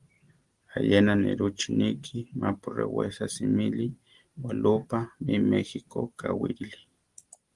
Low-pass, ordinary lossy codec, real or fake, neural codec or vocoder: 10.8 kHz; Opus, 24 kbps; real; none